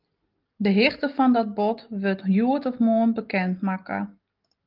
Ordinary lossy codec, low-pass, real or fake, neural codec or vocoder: Opus, 32 kbps; 5.4 kHz; real; none